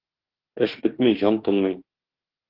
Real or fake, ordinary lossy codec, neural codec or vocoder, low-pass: fake; Opus, 16 kbps; autoencoder, 48 kHz, 32 numbers a frame, DAC-VAE, trained on Japanese speech; 5.4 kHz